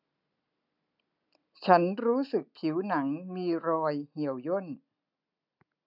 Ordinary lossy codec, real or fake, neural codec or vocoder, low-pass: none; real; none; 5.4 kHz